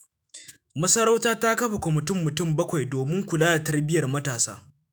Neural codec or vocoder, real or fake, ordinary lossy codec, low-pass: autoencoder, 48 kHz, 128 numbers a frame, DAC-VAE, trained on Japanese speech; fake; none; none